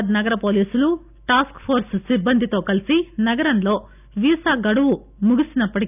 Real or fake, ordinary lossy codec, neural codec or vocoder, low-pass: real; none; none; 3.6 kHz